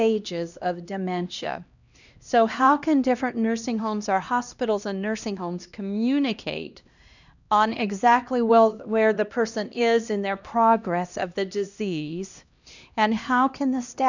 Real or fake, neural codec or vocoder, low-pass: fake; codec, 16 kHz, 1 kbps, X-Codec, HuBERT features, trained on LibriSpeech; 7.2 kHz